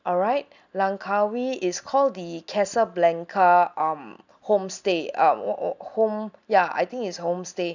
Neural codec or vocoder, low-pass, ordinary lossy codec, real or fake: none; 7.2 kHz; none; real